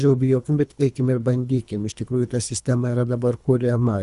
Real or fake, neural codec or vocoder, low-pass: fake; codec, 24 kHz, 3 kbps, HILCodec; 10.8 kHz